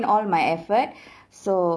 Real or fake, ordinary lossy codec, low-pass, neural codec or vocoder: real; none; none; none